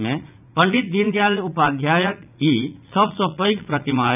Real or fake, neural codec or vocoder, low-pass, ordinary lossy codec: fake; vocoder, 44.1 kHz, 80 mel bands, Vocos; 3.6 kHz; none